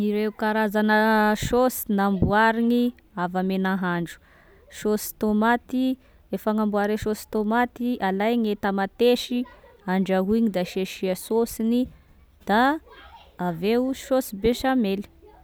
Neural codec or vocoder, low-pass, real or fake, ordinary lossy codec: none; none; real; none